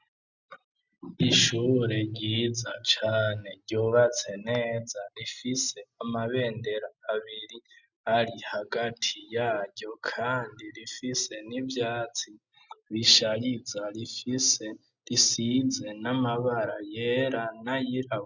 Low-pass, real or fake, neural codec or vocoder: 7.2 kHz; real; none